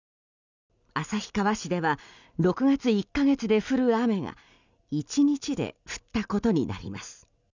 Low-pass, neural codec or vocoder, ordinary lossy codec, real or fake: 7.2 kHz; none; none; real